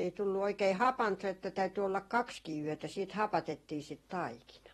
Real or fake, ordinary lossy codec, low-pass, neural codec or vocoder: fake; AAC, 32 kbps; 19.8 kHz; vocoder, 44.1 kHz, 128 mel bands every 256 samples, BigVGAN v2